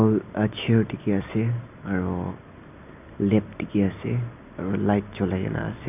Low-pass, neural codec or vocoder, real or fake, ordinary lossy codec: 3.6 kHz; none; real; none